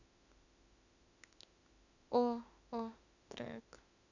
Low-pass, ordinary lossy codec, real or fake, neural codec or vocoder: 7.2 kHz; none; fake; autoencoder, 48 kHz, 32 numbers a frame, DAC-VAE, trained on Japanese speech